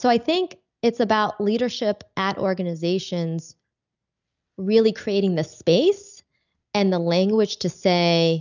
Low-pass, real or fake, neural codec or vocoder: 7.2 kHz; real; none